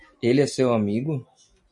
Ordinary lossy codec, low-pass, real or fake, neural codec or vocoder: MP3, 48 kbps; 10.8 kHz; real; none